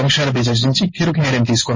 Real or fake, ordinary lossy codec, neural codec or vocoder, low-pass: fake; MP3, 32 kbps; codec, 16 kHz in and 24 kHz out, 1 kbps, XY-Tokenizer; 7.2 kHz